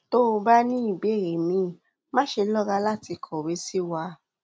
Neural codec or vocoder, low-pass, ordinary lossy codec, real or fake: none; none; none; real